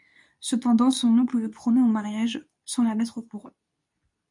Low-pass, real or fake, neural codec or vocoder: 10.8 kHz; fake; codec, 24 kHz, 0.9 kbps, WavTokenizer, medium speech release version 2